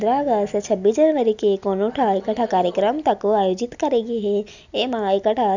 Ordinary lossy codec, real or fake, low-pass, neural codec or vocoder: none; real; 7.2 kHz; none